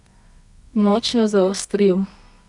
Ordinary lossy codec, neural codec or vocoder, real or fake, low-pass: none; codec, 24 kHz, 0.9 kbps, WavTokenizer, medium music audio release; fake; 10.8 kHz